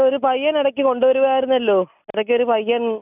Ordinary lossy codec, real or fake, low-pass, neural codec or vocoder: none; real; 3.6 kHz; none